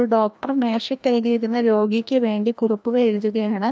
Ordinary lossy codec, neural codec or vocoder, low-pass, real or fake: none; codec, 16 kHz, 1 kbps, FreqCodec, larger model; none; fake